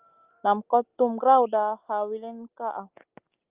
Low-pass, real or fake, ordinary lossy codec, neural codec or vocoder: 3.6 kHz; real; Opus, 24 kbps; none